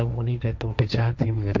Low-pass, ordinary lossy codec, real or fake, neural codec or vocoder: 7.2 kHz; none; fake; codec, 16 kHz, 1.1 kbps, Voila-Tokenizer